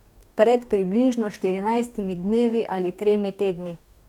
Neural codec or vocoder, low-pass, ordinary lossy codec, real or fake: codec, 44.1 kHz, 2.6 kbps, DAC; 19.8 kHz; none; fake